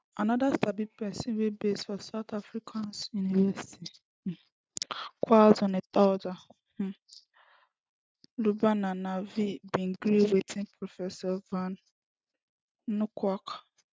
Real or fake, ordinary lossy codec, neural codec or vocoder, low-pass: real; none; none; none